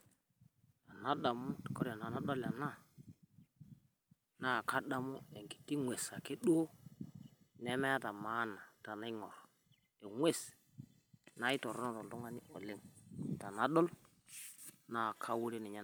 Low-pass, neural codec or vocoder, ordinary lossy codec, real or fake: none; none; none; real